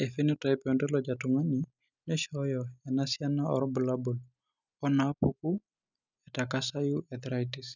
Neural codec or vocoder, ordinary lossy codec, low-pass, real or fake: none; none; 7.2 kHz; real